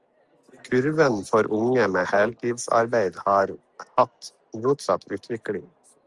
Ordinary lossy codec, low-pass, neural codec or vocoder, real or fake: Opus, 32 kbps; 10.8 kHz; none; real